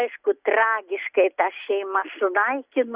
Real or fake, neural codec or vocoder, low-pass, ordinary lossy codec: real; none; 3.6 kHz; Opus, 64 kbps